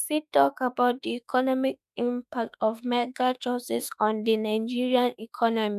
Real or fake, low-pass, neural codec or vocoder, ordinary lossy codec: fake; 14.4 kHz; autoencoder, 48 kHz, 32 numbers a frame, DAC-VAE, trained on Japanese speech; none